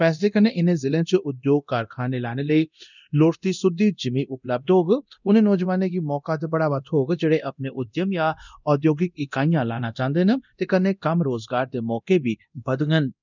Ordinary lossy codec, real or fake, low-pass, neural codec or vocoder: none; fake; 7.2 kHz; codec, 24 kHz, 0.9 kbps, DualCodec